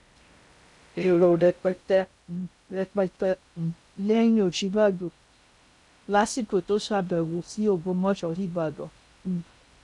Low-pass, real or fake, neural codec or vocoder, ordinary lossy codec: 10.8 kHz; fake; codec, 16 kHz in and 24 kHz out, 0.6 kbps, FocalCodec, streaming, 2048 codes; none